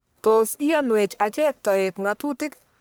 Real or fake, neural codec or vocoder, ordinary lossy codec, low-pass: fake; codec, 44.1 kHz, 1.7 kbps, Pupu-Codec; none; none